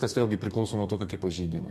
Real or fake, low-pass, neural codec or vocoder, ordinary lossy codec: fake; 14.4 kHz; codec, 32 kHz, 1.9 kbps, SNAC; MP3, 64 kbps